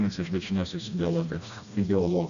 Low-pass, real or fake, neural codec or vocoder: 7.2 kHz; fake; codec, 16 kHz, 1 kbps, FreqCodec, smaller model